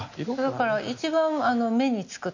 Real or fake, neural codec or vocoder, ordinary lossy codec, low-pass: real; none; none; 7.2 kHz